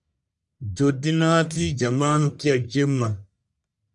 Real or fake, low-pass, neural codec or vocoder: fake; 10.8 kHz; codec, 44.1 kHz, 1.7 kbps, Pupu-Codec